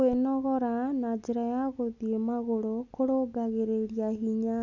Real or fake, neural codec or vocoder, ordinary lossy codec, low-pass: real; none; none; 7.2 kHz